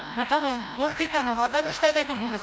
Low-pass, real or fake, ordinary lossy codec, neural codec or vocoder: none; fake; none; codec, 16 kHz, 0.5 kbps, FreqCodec, larger model